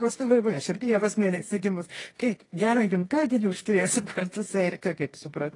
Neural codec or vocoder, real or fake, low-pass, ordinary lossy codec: codec, 24 kHz, 0.9 kbps, WavTokenizer, medium music audio release; fake; 10.8 kHz; AAC, 32 kbps